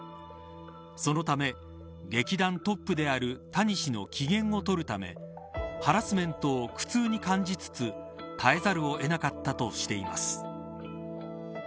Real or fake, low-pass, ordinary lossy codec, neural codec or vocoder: real; none; none; none